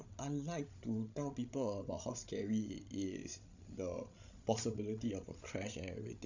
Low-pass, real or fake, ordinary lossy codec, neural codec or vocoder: 7.2 kHz; fake; none; codec, 16 kHz, 16 kbps, FreqCodec, larger model